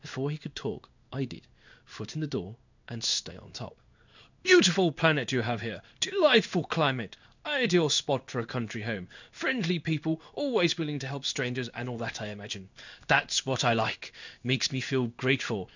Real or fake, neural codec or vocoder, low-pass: fake; codec, 16 kHz in and 24 kHz out, 1 kbps, XY-Tokenizer; 7.2 kHz